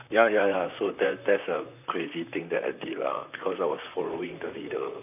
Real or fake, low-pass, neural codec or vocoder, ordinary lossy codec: fake; 3.6 kHz; vocoder, 44.1 kHz, 128 mel bands, Pupu-Vocoder; none